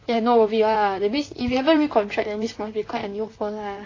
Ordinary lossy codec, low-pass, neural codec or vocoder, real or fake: AAC, 32 kbps; 7.2 kHz; vocoder, 44.1 kHz, 128 mel bands, Pupu-Vocoder; fake